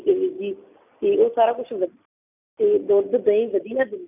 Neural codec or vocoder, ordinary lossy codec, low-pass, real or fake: none; none; 3.6 kHz; real